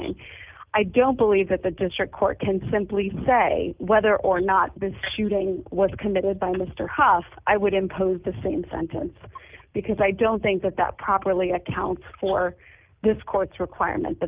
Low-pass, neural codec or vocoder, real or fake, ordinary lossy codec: 3.6 kHz; none; real; Opus, 32 kbps